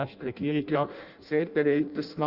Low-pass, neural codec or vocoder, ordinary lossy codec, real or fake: 5.4 kHz; codec, 16 kHz in and 24 kHz out, 0.6 kbps, FireRedTTS-2 codec; Opus, 64 kbps; fake